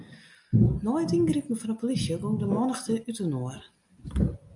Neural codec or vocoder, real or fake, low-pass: none; real; 10.8 kHz